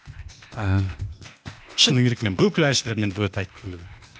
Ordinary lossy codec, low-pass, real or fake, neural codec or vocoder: none; none; fake; codec, 16 kHz, 0.8 kbps, ZipCodec